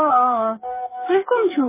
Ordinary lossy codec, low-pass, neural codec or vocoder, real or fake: MP3, 16 kbps; 3.6 kHz; codec, 44.1 kHz, 2.6 kbps, DAC; fake